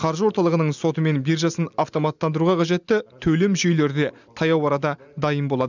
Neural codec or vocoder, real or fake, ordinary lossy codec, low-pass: none; real; none; 7.2 kHz